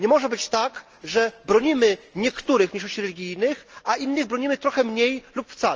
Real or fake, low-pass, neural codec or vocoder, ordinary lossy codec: real; 7.2 kHz; none; Opus, 24 kbps